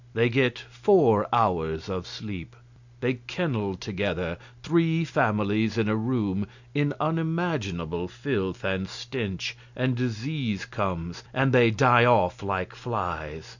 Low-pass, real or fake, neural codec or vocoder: 7.2 kHz; real; none